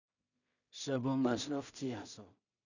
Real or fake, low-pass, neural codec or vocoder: fake; 7.2 kHz; codec, 16 kHz in and 24 kHz out, 0.4 kbps, LongCat-Audio-Codec, two codebook decoder